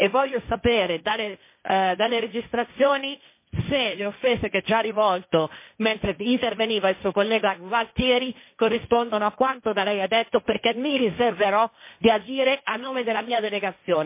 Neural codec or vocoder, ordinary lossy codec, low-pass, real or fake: codec, 16 kHz, 1.1 kbps, Voila-Tokenizer; MP3, 24 kbps; 3.6 kHz; fake